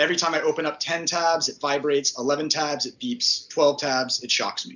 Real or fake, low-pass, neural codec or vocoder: real; 7.2 kHz; none